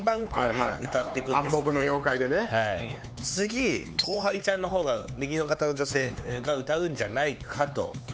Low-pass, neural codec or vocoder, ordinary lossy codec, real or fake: none; codec, 16 kHz, 4 kbps, X-Codec, HuBERT features, trained on LibriSpeech; none; fake